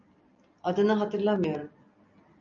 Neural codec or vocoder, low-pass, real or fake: none; 7.2 kHz; real